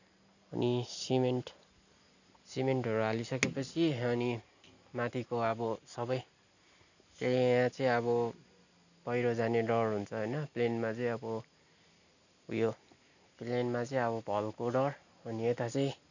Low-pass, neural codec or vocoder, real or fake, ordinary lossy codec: 7.2 kHz; none; real; none